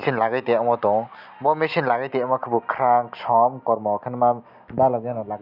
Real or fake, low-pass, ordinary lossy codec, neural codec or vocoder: real; 5.4 kHz; none; none